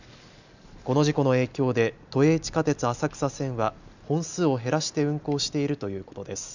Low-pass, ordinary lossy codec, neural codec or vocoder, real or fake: 7.2 kHz; none; none; real